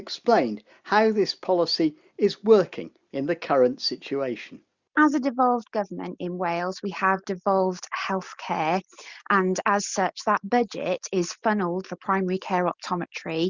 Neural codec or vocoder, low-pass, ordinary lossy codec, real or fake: none; 7.2 kHz; Opus, 64 kbps; real